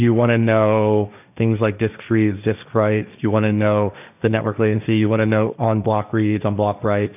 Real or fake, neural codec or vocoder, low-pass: fake; codec, 16 kHz, 1.1 kbps, Voila-Tokenizer; 3.6 kHz